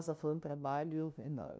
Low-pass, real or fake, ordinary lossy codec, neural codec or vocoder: none; fake; none; codec, 16 kHz, 0.5 kbps, FunCodec, trained on LibriTTS, 25 frames a second